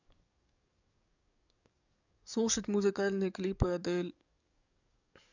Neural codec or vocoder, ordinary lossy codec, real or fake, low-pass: codec, 44.1 kHz, 7.8 kbps, DAC; none; fake; 7.2 kHz